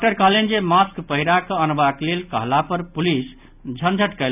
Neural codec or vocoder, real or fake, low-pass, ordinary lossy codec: none; real; 3.6 kHz; none